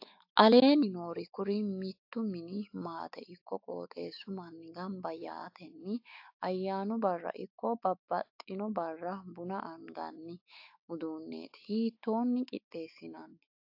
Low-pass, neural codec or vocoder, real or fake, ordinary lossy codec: 5.4 kHz; autoencoder, 48 kHz, 128 numbers a frame, DAC-VAE, trained on Japanese speech; fake; AAC, 48 kbps